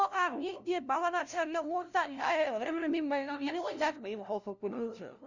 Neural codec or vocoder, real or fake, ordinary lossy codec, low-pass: codec, 16 kHz, 0.5 kbps, FunCodec, trained on LibriTTS, 25 frames a second; fake; none; 7.2 kHz